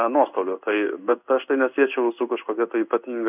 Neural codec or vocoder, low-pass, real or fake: codec, 16 kHz in and 24 kHz out, 1 kbps, XY-Tokenizer; 3.6 kHz; fake